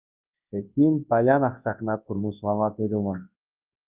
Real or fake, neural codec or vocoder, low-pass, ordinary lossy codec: fake; codec, 24 kHz, 0.9 kbps, WavTokenizer, large speech release; 3.6 kHz; Opus, 32 kbps